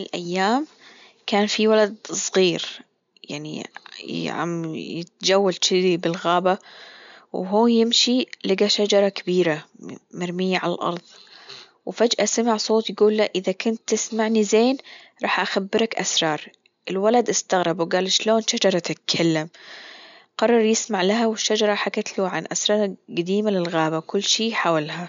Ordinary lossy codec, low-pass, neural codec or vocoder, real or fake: MP3, 64 kbps; 7.2 kHz; none; real